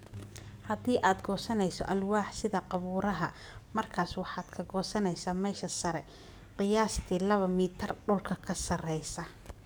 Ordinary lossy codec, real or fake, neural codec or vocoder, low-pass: none; fake; codec, 44.1 kHz, 7.8 kbps, DAC; none